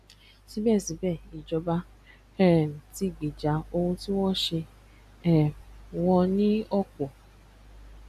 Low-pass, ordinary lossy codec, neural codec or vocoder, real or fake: 14.4 kHz; none; none; real